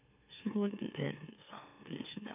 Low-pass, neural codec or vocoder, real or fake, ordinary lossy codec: 3.6 kHz; autoencoder, 44.1 kHz, a latent of 192 numbers a frame, MeloTTS; fake; none